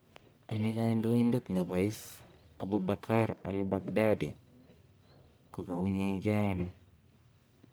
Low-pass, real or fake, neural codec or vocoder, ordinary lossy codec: none; fake; codec, 44.1 kHz, 1.7 kbps, Pupu-Codec; none